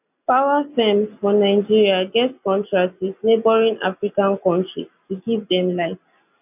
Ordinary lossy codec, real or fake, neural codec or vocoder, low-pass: none; real; none; 3.6 kHz